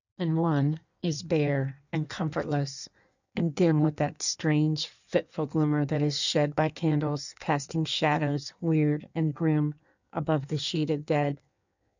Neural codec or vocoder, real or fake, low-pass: codec, 16 kHz in and 24 kHz out, 1.1 kbps, FireRedTTS-2 codec; fake; 7.2 kHz